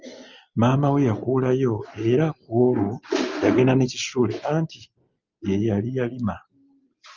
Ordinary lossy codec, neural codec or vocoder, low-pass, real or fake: Opus, 32 kbps; none; 7.2 kHz; real